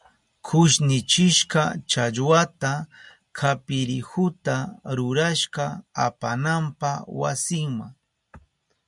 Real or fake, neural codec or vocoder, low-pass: real; none; 10.8 kHz